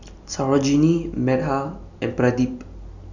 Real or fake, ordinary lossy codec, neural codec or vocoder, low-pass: real; none; none; 7.2 kHz